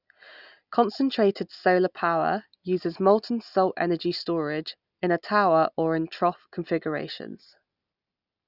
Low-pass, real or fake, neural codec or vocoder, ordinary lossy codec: 5.4 kHz; real; none; none